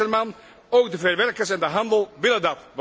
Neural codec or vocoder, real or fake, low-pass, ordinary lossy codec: none; real; none; none